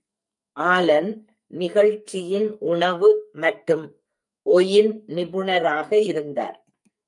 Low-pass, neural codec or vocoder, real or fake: 10.8 kHz; codec, 44.1 kHz, 2.6 kbps, SNAC; fake